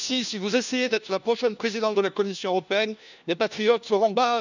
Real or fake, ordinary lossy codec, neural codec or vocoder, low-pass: fake; none; codec, 16 kHz, 1 kbps, FunCodec, trained on LibriTTS, 50 frames a second; 7.2 kHz